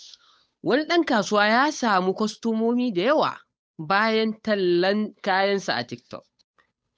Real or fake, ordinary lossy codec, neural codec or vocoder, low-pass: fake; none; codec, 16 kHz, 8 kbps, FunCodec, trained on Chinese and English, 25 frames a second; none